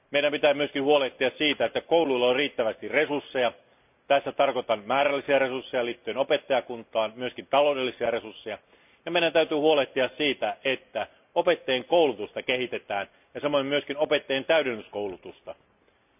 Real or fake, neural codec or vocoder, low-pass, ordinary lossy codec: real; none; 3.6 kHz; none